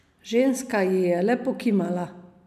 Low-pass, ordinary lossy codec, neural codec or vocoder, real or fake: 14.4 kHz; none; none; real